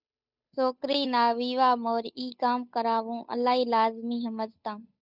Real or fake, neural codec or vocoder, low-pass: fake; codec, 16 kHz, 8 kbps, FunCodec, trained on Chinese and English, 25 frames a second; 5.4 kHz